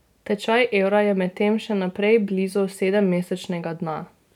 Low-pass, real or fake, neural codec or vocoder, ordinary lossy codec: 19.8 kHz; real; none; none